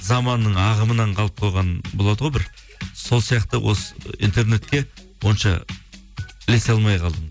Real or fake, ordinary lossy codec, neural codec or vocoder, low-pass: real; none; none; none